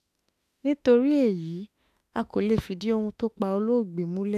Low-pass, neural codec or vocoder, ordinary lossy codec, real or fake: 14.4 kHz; autoencoder, 48 kHz, 32 numbers a frame, DAC-VAE, trained on Japanese speech; none; fake